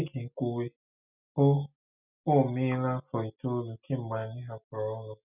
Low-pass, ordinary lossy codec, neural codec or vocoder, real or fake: 3.6 kHz; none; none; real